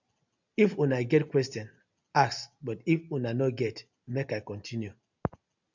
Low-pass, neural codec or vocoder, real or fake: 7.2 kHz; none; real